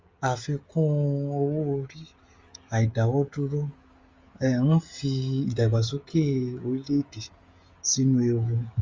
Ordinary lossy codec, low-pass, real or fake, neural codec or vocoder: Opus, 32 kbps; 7.2 kHz; fake; codec, 16 kHz, 16 kbps, FreqCodec, smaller model